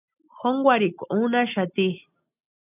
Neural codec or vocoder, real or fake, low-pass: none; real; 3.6 kHz